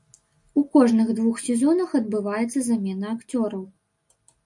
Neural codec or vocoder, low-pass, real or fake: none; 10.8 kHz; real